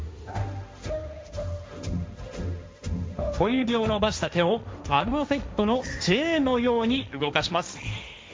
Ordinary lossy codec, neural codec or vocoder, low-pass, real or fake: none; codec, 16 kHz, 1.1 kbps, Voila-Tokenizer; none; fake